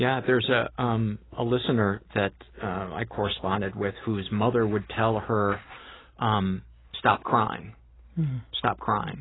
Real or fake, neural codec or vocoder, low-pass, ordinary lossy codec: real; none; 7.2 kHz; AAC, 16 kbps